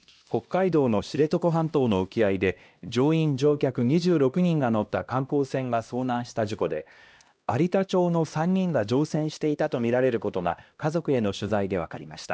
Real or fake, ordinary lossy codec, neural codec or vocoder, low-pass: fake; none; codec, 16 kHz, 1 kbps, X-Codec, HuBERT features, trained on LibriSpeech; none